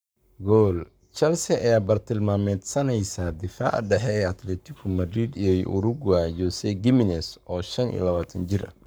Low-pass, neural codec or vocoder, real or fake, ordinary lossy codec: none; codec, 44.1 kHz, 7.8 kbps, Pupu-Codec; fake; none